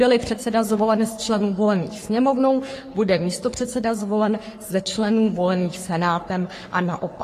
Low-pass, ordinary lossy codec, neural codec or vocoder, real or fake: 14.4 kHz; AAC, 48 kbps; codec, 44.1 kHz, 3.4 kbps, Pupu-Codec; fake